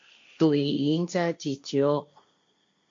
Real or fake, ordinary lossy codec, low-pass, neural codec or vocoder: fake; MP3, 48 kbps; 7.2 kHz; codec, 16 kHz, 1.1 kbps, Voila-Tokenizer